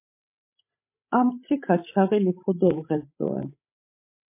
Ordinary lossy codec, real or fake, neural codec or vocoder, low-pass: MP3, 24 kbps; fake; codec, 16 kHz, 16 kbps, FreqCodec, larger model; 3.6 kHz